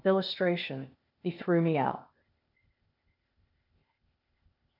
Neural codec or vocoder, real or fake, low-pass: codec, 16 kHz, 0.8 kbps, ZipCodec; fake; 5.4 kHz